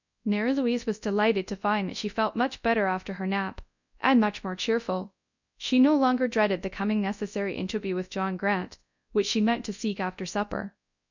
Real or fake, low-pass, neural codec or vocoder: fake; 7.2 kHz; codec, 24 kHz, 0.9 kbps, WavTokenizer, large speech release